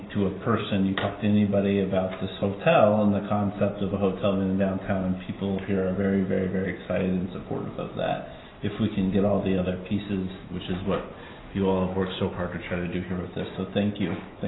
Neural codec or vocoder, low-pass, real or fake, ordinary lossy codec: none; 7.2 kHz; real; AAC, 16 kbps